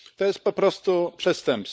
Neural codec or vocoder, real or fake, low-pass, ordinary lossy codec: codec, 16 kHz, 16 kbps, FunCodec, trained on LibriTTS, 50 frames a second; fake; none; none